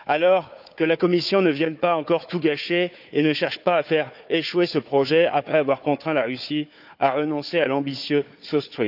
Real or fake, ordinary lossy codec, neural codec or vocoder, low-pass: fake; none; codec, 16 kHz, 4 kbps, FunCodec, trained on Chinese and English, 50 frames a second; 5.4 kHz